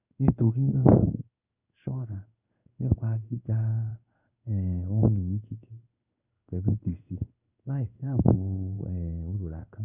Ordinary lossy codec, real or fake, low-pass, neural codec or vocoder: none; fake; 3.6 kHz; codec, 16 kHz in and 24 kHz out, 1 kbps, XY-Tokenizer